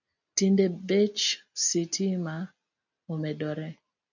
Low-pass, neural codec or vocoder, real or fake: 7.2 kHz; none; real